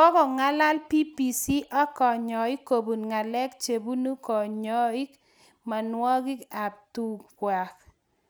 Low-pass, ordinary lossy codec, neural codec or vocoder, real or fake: none; none; none; real